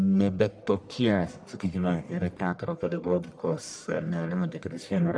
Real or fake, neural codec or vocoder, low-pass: fake; codec, 44.1 kHz, 1.7 kbps, Pupu-Codec; 9.9 kHz